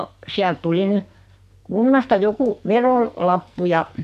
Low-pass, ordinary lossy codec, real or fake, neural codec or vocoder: 14.4 kHz; none; fake; codec, 44.1 kHz, 2.6 kbps, SNAC